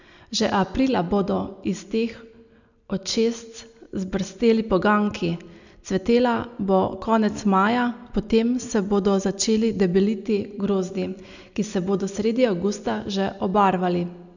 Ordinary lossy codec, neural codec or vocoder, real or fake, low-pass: none; none; real; 7.2 kHz